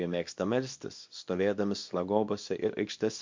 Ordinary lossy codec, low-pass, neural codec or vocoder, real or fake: MP3, 48 kbps; 7.2 kHz; codec, 24 kHz, 0.9 kbps, WavTokenizer, small release; fake